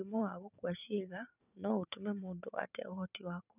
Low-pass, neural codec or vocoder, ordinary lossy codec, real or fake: 3.6 kHz; vocoder, 44.1 kHz, 128 mel bands every 512 samples, BigVGAN v2; none; fake